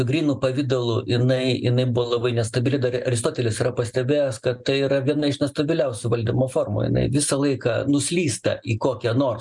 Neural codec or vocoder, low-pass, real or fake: none; 10.8 kHz; real